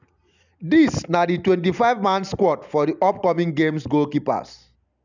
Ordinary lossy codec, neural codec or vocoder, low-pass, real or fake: none; none; 7.2 kHz; real